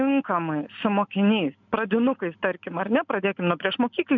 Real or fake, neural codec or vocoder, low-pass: real; none; 7.2 kHz